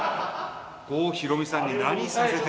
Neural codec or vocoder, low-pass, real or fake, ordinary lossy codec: none; none; real; none